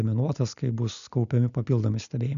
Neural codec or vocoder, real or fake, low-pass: none; real; 7.2 kHz